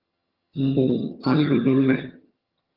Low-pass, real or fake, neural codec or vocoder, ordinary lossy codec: 5.4 kHz; fake; vocoder, 22.05 kHz, 80 mel bands, HiFi-GAN; Opus, 32 kbps